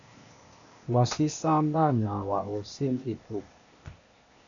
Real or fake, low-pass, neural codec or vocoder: fake; 7.2 kHz; codec, 16 kHz, 0.8 kbps, ZipCodec